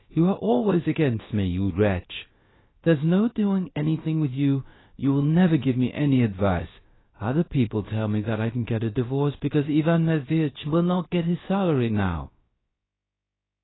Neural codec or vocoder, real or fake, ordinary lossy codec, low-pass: codec, 16 kHz, about 1 kbps, DyCAST, with the encoder's durations; fake; AAC, 16 kbps; 7.2 kHz